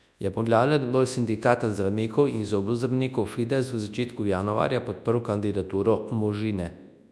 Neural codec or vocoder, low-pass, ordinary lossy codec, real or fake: codec, 24 kHz, 0.9 kbps, WavTokenizer, large speech release; none; none; fake